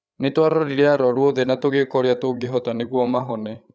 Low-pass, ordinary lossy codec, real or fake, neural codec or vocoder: none; none; fake; codec, 16 kHz, 8 kbps, FreqCodec, larger model